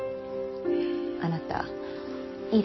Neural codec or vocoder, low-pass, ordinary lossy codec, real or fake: none; 7.2 kHz; MP3, 24 kbps; real